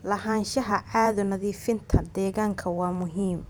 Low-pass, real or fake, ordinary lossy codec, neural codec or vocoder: none; fake; none; vocoder, 44.1 kHz, 128 mel bands every 512 samples, BigVGAN v2